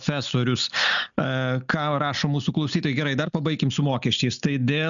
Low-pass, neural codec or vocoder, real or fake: 7.2 kHz; none; real